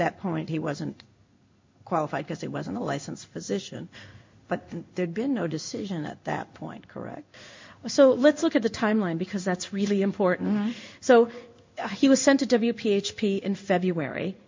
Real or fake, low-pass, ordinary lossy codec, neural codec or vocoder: fake; 7.2 kHz; MP3, 48 kbps; codec, 16 kHz in and 24 kHz out, 1 kbps, XY-Tokenizer